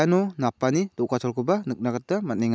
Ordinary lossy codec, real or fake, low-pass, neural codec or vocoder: none; real; none; none